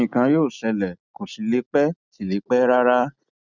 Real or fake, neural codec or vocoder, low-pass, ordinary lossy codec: real; none; 7.2 kHz; none